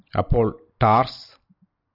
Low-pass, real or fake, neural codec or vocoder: 5.4 kHz; real; none